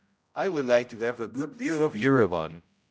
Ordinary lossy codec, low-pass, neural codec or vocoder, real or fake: none; none; codec, 16 kHz, 0.5 kbps, X-Codec, HuBERT features, trained on general audio; fake